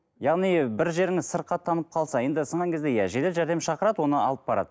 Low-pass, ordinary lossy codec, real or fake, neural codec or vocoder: none; none; real; none